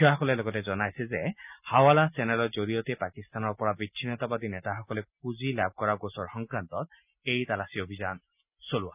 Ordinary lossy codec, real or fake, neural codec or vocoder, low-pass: none; real; none; 3.6 kHz